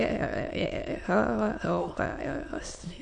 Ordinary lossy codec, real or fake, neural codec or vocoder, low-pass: MP3, 48 kbps; fake; autoencoder, 22.05 kHz, a latent of 192 numbers a frame, VITS, trained on many speakers; 9.9 kHz